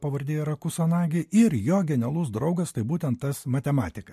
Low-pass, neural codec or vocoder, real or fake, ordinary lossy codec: 14.4 kHz; none; real; MP3, 64 kbps